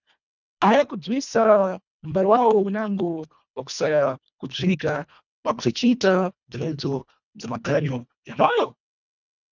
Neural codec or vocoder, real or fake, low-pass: codec, 24 kHz, 1.5 kbps, HILCodec; fake; 7.2 kHz